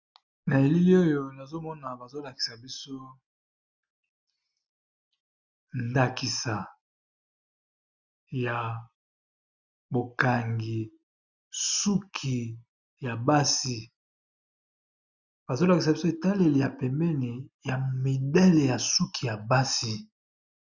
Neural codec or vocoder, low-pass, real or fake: none; 7.2 kHz; real